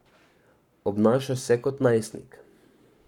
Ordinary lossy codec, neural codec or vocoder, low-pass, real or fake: none; codec, 44.1 kHz, 7.8 kbps, Pupu-Codec; 19.8 kHz; fake